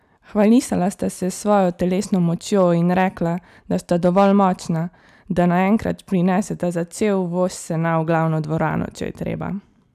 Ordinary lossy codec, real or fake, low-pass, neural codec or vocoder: none; real; 14.4 kHz; none